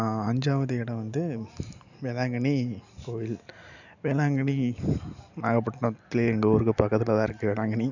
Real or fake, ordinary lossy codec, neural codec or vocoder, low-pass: real; none; none; 7.2 kHz